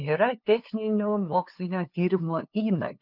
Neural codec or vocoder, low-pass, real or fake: codec, 16 kHz, 2 kbps, FunCodec, trained on LibriTTS, 25 frames a second; 5.4 kHz; fake